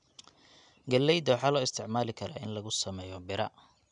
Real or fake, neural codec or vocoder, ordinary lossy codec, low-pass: real; none; none; 9.9 kHz